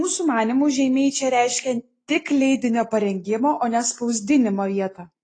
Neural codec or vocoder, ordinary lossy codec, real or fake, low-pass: none; AAC, 32 kbps; real; 9.9 kHz